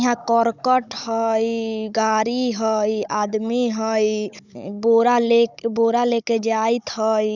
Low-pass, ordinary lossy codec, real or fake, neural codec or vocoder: 7.2 kHz; none; fake; codec, 16 kHz, 16 kbps, FunCodec, trained on Chinese and English, 50 frames a second